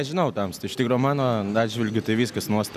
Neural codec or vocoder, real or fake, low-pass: none; real; 14.4 kHz